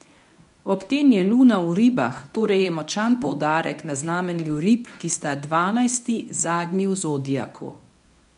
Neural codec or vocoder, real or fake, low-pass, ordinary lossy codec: codec, 24 kHz, 0.9 kbps, WavTokenizer, medium speech release version 1; fake; 10.8 kHz; none